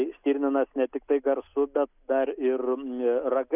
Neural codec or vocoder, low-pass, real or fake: none; 3.6 kHz; real